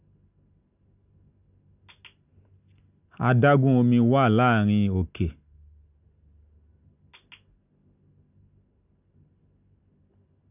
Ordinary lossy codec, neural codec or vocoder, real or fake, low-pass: none; none; real; 3.6 kHz